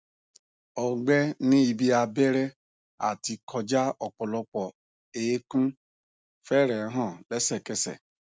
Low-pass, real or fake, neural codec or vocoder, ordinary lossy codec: none; real; none; none